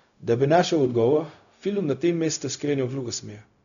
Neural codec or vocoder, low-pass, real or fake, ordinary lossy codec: codec, 16 kHz, 0.4 kbps, LongCat-Audio-Codec; 7.2 kHz; fake; none